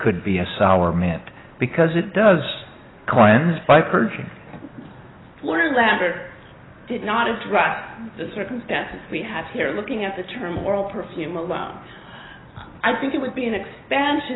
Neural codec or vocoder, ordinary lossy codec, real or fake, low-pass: none; AAC, 16 kbps; real; 7.2 kHz